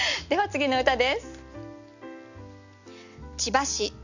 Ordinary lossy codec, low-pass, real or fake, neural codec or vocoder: none; 7.2 kHz; real; none